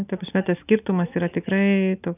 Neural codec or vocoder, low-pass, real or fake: none; 3.6 kHz; real